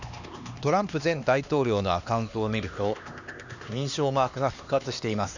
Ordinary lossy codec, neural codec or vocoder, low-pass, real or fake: none; codec, 16 kHz, 2 kbps, X-Codec, HuBERT features, trained on LibriSpeech; 7.2 kHz; fake